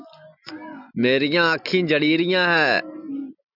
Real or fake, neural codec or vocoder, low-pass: real; none; 5.4 kHz